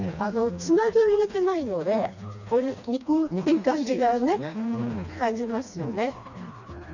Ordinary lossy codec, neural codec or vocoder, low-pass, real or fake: none; codec, 16 kHz, 2 kbps, FreqCodec, smaller model; 7.2 kHz; fake